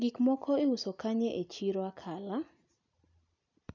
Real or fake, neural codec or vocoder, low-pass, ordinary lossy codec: real; none; 7.2 kHz; none